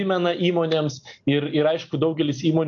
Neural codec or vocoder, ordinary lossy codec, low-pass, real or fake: none; AAC, 64 kbps; 7.2 kHz; real